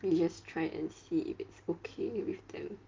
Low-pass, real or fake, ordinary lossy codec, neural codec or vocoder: 7.2 kHz; real; Opus, 24 kbps; none